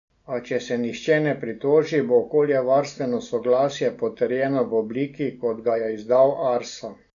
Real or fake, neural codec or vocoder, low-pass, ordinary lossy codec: real; none; 7.2 kHz; none